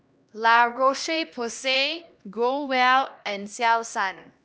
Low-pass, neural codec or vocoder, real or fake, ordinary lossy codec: none; codec, 16 kHz, 1 kbps, X-Codec, HuBERT features, trained on LibriSpeech; fake; none